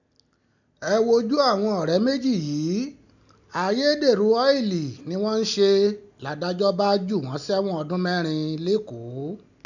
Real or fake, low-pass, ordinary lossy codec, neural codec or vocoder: real; 7.2 kHz; none; none